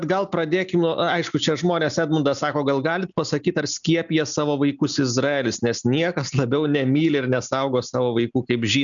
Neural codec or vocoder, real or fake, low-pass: none; real; 7.2 kHz